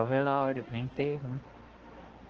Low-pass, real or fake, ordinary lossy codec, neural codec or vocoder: 7.2 kHz; fake; Opus, 16 kbps; codec, 16 kHz, 2 kbps, X-Codec, HuBERT features, trained on balanced general audio